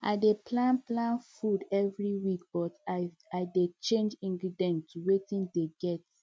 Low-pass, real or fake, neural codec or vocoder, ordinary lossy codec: none; real; none; none